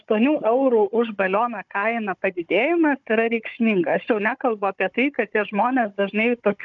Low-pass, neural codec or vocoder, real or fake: 7.2 kHz; codec, 16 kHz, 16 kbps, FunCodec, trained on Chinese and English, 50 frames a second; fake